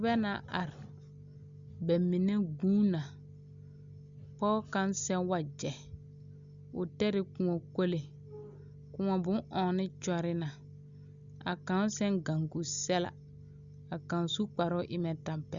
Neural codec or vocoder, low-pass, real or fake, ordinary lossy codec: none; 7.2 kHz; real; Opus, 64 kbps